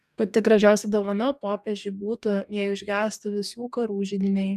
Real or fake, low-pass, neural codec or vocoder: fake; 14.4 kHz; codec, 44.1 kHz, 2.6 kbps, DAC